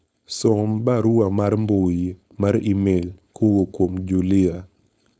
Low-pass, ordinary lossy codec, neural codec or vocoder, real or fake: none; none; codec, 16 kHz, 4.8 kbps, FACodec; fake